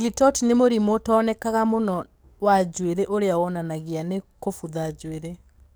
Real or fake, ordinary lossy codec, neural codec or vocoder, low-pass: fake; none; codec, 44.1 kHz, 7.8 kbps, DAC; none